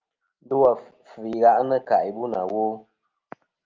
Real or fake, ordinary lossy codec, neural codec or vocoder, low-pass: real; Opus, 24 kbps; none; 7.2 kHz